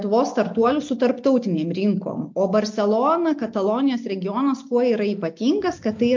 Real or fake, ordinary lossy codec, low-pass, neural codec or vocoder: real; MP3, 48 kbps; 7.2 kHz; none